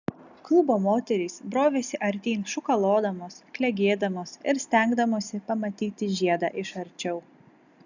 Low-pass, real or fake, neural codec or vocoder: 7.2 kHz; real; none